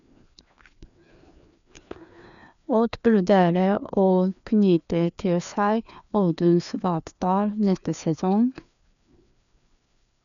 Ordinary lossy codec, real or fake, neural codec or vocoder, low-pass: none; fake; codec, 16 kHz, 2 kbps, FreqCodec, larger model; 7.2 kHz